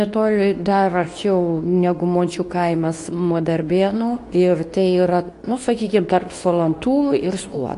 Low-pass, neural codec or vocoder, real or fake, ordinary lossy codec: 10.8 kHz; codec, 24 kHz, 0.9 kbps, WavTokenizer, medium speech release version 2; fake; AAC, 48 kbps